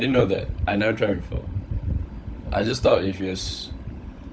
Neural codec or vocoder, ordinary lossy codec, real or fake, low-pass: codec, 16 kHz, 16 kbps, FunCodec, trained on LibriTTS, 50 frames a second; none; fake; none